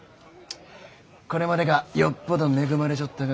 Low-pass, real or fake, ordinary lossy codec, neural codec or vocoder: none; real; none; none